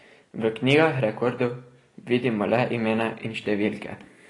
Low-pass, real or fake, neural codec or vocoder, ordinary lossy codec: 10.8 kHz; real; none; AAC, 32 kbps